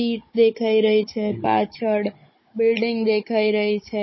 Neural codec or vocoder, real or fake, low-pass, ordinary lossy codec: codec, 16 kHz, 16 kbps, FunCodec, trained on Chinese and English, 50 frames a second; fake; 7.2 kHz; MP3, 24 kbps